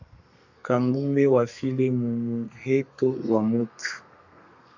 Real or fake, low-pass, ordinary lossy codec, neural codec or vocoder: fake; 7.2 kHz; AAC, 48 kbps; codec, 32 kHz, 1.9 kbps, SNAC